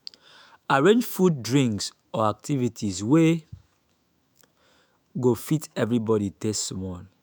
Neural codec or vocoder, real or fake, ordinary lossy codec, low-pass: autoencoder, 48 kHz, 128 numbers a frame, DAC-VAE, trained on Japanese speech; fake; none; none